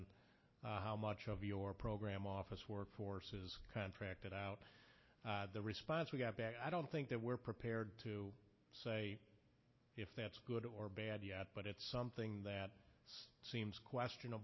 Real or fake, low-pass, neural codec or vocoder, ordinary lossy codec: real; 7.2 kHz; none; MP3, 24 kbps